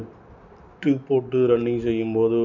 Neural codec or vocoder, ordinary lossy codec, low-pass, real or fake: none; none; 7.2 kHz; real